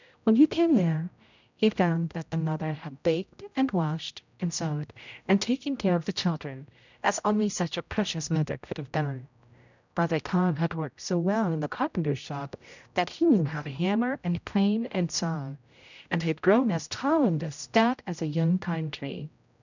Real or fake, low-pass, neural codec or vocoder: fake; 7.2 kHz; codec, 16 kHz, 0.5 kbps, X-Codec, HuBERT features, trained on general audio